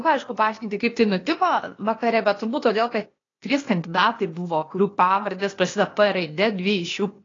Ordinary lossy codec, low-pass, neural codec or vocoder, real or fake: AAC, 32 kbps; 7.2 kHz; codec, 16 kHz, 0.8 kbps, ZipCodec; fake